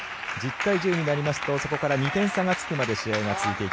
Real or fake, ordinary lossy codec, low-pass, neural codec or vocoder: real; none; none; none